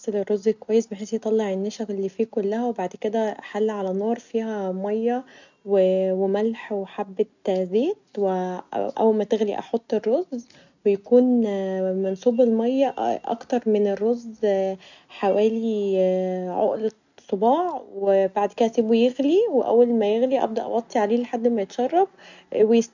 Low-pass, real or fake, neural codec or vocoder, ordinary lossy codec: 7.2 kHz; real; none; none